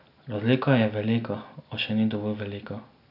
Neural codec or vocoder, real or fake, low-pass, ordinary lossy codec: none; real; 5.4 kHz; none